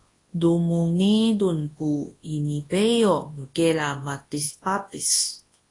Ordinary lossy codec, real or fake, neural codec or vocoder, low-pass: AAC, 32 kbps; fake; codec, 24 kHz, 0.9 kbps, WavTokenizer, large speech release; 10.8 kHz